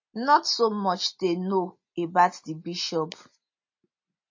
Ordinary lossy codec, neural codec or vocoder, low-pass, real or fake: MP3, 32 kbps; none; 7.2 kHz; real